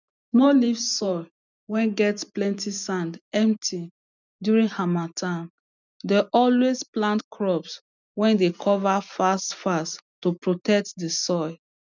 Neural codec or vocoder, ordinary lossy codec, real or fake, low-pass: none; none; real; 7.2 kHz